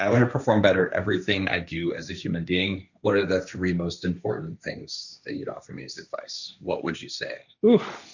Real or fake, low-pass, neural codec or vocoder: fake; 7.2 kHz; codec, 16 kHz, 1.1 kbps, Voila-Tokenizer